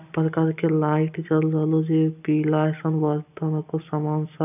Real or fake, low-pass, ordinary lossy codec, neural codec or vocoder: real; 3.6 kHz; none; none